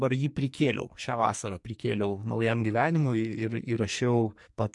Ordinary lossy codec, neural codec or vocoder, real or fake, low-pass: MP3, 64 kbps; codec, 44.1 kHz, 2.6 kbps, SNAC; fake; 10.8 kHz